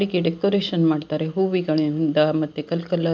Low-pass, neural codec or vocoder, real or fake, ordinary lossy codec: none; none; real; none